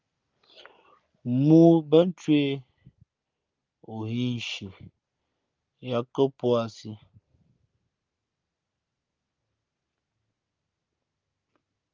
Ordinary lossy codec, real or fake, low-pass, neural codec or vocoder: Opus, 32 kbps; real; 7.2 kHz; none